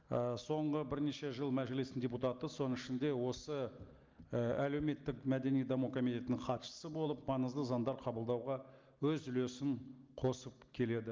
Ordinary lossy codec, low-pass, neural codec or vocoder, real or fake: Opus, 32 kbps; 7.2 kHz; none; real